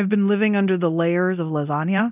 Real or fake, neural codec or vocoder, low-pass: fake; codec, 24 kHz, 0.9 kbps, DualCodec; 3.6 kHz